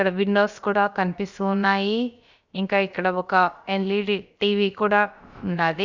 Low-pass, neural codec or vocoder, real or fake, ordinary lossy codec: 7.2 kHz; codec, 16 kHz, about 1 kbps, DyCAST, with the encoder's durations; fake; Opus, 64 kbps